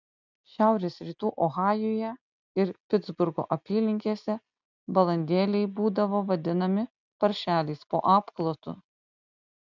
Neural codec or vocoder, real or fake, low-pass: none; real; 7.2 kHz